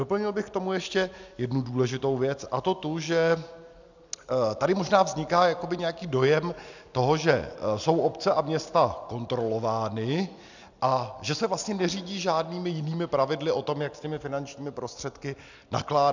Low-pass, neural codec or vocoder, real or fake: 7.2 kHz; none; real